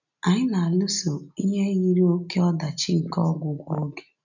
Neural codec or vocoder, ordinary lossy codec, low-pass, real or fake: none; none; 7.2 kHz; real